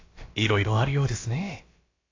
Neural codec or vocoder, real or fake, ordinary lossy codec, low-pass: codec, 16 kHz, about 1 kbps, DyCAST, with the encoder's durations; fake; AAC, 32 kbps; 7.2 kHz